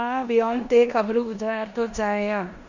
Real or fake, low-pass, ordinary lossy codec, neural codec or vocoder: fake; 7.2 kHz; none; codec, 16 kHz in and 24 kHz out, 0.9 kbps, LongCat-Audio-Codec, four codebook decoder